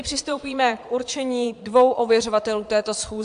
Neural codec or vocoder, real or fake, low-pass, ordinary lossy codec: vocoder, 22.05 kHz, 80 mel bands, Vocos; fake; 9.9 kHz; AAC, 64 kbps